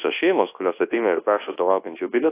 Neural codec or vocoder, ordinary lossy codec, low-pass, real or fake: codec, 24 kHz, 0.9 kbps, WavTokenizer, large speech release; AAC, 16 kbps; 3.6 kHz; fake